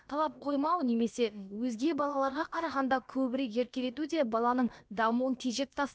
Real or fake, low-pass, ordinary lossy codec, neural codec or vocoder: fake; none; none; codec, 16 kHz, about 1 kbps, DyCAST, with the encoder's durations